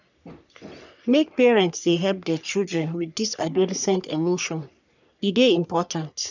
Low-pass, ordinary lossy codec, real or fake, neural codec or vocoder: 7.2 kHz; none; fake; codec, 44.1 kHz, 3.4 kbps, Pupu-Codec